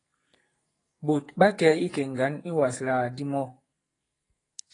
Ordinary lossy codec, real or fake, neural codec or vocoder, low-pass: AAC, 32 kbps; fake; codec, 44.1 kHz, 2.6 kbps, SNAC; 10.8 kHz